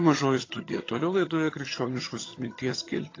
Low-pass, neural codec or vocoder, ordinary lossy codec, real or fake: 7.2 kHz; vocoder, 22.05 kHz, 80 mel bands, HiFi-GAN; AAC, 32 kbps; fake